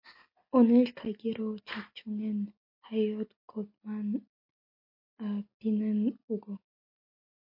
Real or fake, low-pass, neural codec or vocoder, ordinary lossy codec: real; 5.4 kHz; none; MP3, 32 kbps